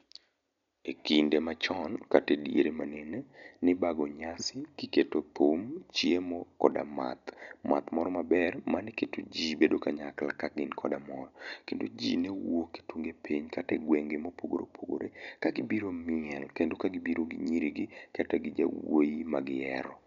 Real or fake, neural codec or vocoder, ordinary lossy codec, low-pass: real; none; none; 7.2 kHz